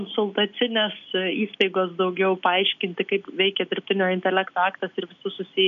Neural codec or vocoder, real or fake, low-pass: none; real; 7.2 kHz